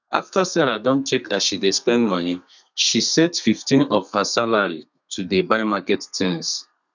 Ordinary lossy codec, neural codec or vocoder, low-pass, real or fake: none; codec, 32 kHz, 1.9 kbps, SNAC; 7.2 kHz; fake